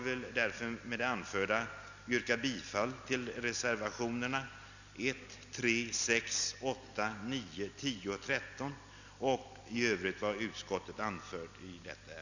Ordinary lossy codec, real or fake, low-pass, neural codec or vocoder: none; real; 7.2 kHz; none